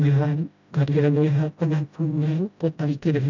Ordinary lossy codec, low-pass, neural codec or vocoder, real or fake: none; 7.2 kHz; codec, 16 kHz, 0.5 kbps, FreqCodec, smaller model; fake